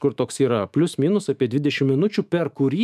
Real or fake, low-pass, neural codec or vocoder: fake; 14.4 kHz; autoencoder, 48 kHz, 128 numbers a frame, DAC-VAE, trained on Japanese speech